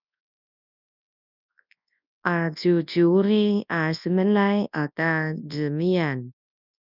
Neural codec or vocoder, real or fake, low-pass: codec, 24 kHz, 0.9 kbps, WavTokenizer, large speech release; fake; 5.4 kHz